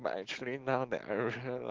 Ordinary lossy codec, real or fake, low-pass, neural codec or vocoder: Opus, 16 kbps; real; 7.2 kHz; none